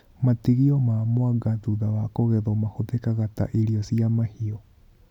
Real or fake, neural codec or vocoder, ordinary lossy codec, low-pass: real; none; none; 19.8 kHz